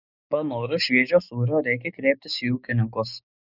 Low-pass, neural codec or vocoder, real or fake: 5.4 kHz; codec, 16 kHz, 6 kbps, DAC; fake